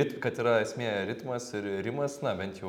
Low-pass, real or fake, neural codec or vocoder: 19.8 kHz; real; none